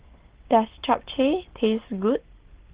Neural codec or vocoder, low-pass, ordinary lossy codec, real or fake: none; 3.6 kHz; Opus, 16 kbps; real